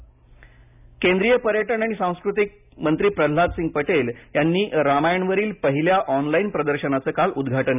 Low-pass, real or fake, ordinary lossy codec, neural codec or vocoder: 3.6 kHz; real; none; none